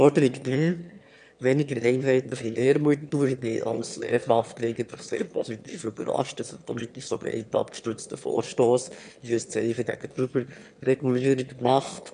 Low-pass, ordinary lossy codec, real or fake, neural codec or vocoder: 9.9 kHz; none; fake; autoencoder, 22.05 kHz, a latent of 192 numbers a frame, VITS, trained on one speaker